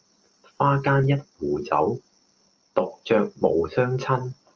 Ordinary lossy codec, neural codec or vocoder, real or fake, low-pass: Opus, 24 kbps; none; real; 7.2 kHz